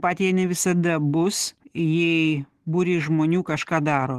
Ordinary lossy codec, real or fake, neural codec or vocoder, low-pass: Opus, 16 kbps; real; none; 14.4 kHz